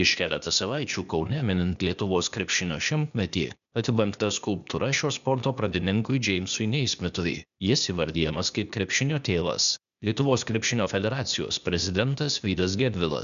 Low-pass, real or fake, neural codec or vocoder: 7.2 kHz; fake; codec, 16 kHz, 0.8 kbps, ZipCodec